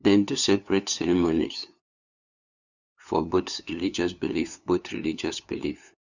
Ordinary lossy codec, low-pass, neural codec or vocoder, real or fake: none; 7.2 kHz; codec, 16 kHz, 2 kbps, FunCodec, trained on LibriTTS, 25 frames a second; fake